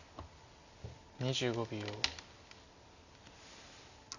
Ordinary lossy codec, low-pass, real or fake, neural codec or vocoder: none; 7.2 kHz; real; none